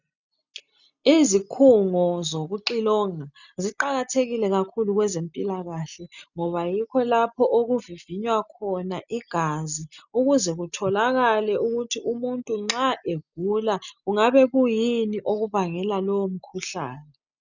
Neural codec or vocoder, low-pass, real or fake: none; 7.2 kHz; real